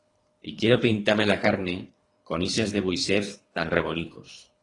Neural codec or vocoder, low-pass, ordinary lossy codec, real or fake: codec, 24 kHz, 3 kbps, HILCodec; 10.8 kHz; AAC, 32 kbps; fake